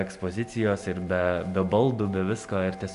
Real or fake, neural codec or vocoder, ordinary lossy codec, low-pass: real; none; MP3, 96 kbps; 10.8 kHz